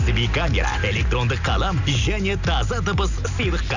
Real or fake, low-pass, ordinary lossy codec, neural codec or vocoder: real; 7.2 kHz; none; none